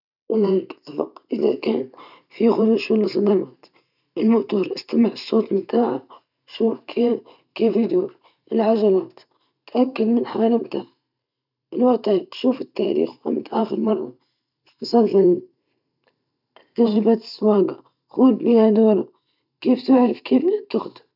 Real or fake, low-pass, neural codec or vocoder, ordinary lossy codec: fake; 5.4 kHz; vocoder, 44.1 kHz, 128 mel bands every 512 samples, BigVGAN v2; none